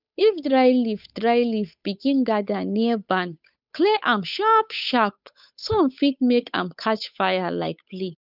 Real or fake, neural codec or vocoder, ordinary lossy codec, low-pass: fake; codec, 16 kHz, 8 kbps, FunCodec, trained on Chinese and English, 25 frames a second; none; 5.4 kHz